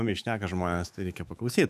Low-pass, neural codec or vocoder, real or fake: 14.4 kHz; autoencoder, 48 kHz, 128 numbers a frame, DAC-VAE, trained on Japanese speech; fake